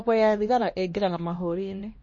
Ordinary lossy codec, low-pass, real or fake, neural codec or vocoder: MP3, 32 kbps; 7.2 kHz; fake; codec, 16 kHz, 1 kbps, X-Codec, HuBERT features, trained on LibriSpeech